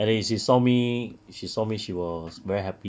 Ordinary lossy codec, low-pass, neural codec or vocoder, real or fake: none; none; none; real